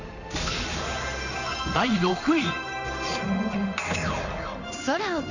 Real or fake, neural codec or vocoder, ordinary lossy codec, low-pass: fake; codec, 16 kHz in and 24 kHz out, 1 kbps, XY-Tokenizer; none; 7.2 kHz